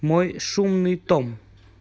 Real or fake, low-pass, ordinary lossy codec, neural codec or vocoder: real; none; none; none